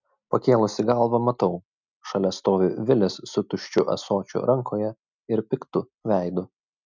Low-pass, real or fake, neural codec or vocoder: 7.2 kHz; real; none